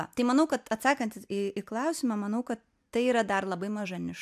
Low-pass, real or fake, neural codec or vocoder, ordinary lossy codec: 14.4 kHz; real; none; AAC, 96 kbps